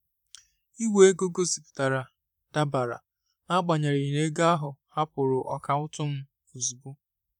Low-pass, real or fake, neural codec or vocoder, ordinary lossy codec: 19.8 kHz; fake; vocoder, 44.1 kHz, 128 mel bands, Pupu-Vocoder; none